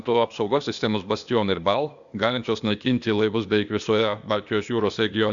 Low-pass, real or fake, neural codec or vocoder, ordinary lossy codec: 7.2 kHz; fake; codec, 16 kHz, 0.8 kbps, ZipCodec; Opus, 64 kbps